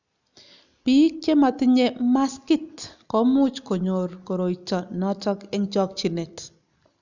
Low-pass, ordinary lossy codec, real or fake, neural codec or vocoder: 7.2 kHz; none; real; none